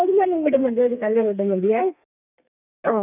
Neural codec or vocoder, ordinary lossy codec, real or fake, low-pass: codec, 32 kHz, 1.9 kbps, SNAC; none; fake; 3.6 kHz